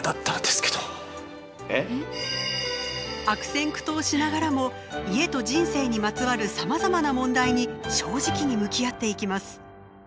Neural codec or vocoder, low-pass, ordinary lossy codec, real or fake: none; none; none; real